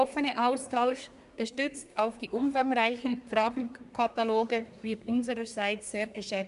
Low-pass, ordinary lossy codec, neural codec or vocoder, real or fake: 10.8 kHz; none; codec, 24 kHz, 1 kbps, SNAC; fake